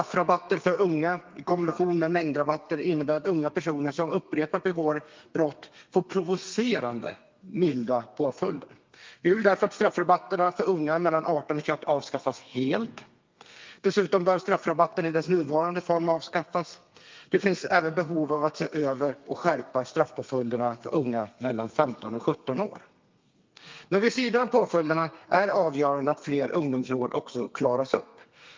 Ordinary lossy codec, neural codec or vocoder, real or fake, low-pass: Opus, 24 kbps; codec, 32 kHz, 1.9 kbps, SNAC; fake; 7.2 kHz